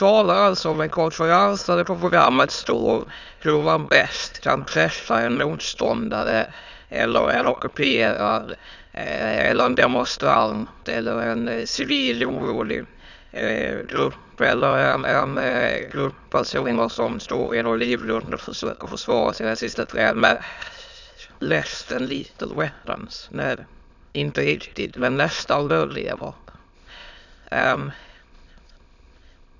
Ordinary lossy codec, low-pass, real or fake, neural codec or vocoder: none; 7.2 kHz; fake; autoencoder, 22.05 kHz, a latent of 192 numbers a frame, VITS, trained on many speakers